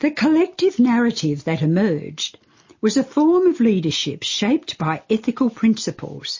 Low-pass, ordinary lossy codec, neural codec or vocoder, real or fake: 7.2 kHz; MP3, 32 kbps; none; real